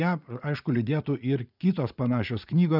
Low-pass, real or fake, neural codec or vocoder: 5.4 kHz; fake; vocoder, 44.1 kHz, 80 mel bands, Vocos